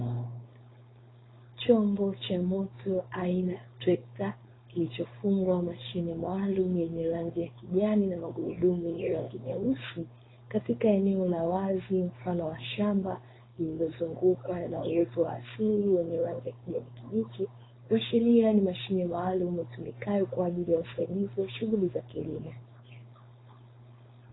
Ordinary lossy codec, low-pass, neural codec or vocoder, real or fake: AAC, 16 kbps; 7.2 kHz; codec, 16 kHz, 4.8 kbps, FACodec; fake